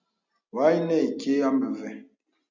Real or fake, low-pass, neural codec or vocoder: real; 7.2 kHz; none